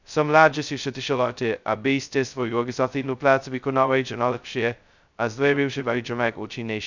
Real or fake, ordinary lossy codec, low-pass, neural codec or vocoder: fake; none; 7.2 kHz; codec, 16 kHz, 0.2 kbps, FocalCodec